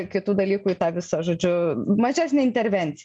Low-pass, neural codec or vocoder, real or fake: 9.9 kHz; none; real